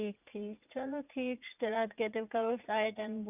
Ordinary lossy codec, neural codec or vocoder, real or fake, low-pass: none; vocoder, 44.1 kHz, 80 mel bands, Vocos; fake; 3.6 kHz